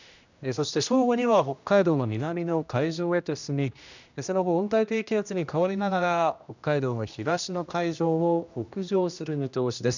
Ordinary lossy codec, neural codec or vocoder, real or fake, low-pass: none; codec, 16 kHz, 1 kbps, X-Codec, HuBERT features, trained on general audio; fake; 7.2 kHz